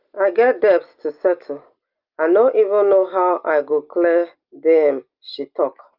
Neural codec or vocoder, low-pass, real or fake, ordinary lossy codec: none; 5.4 kHz; real; Opus, 24 kbps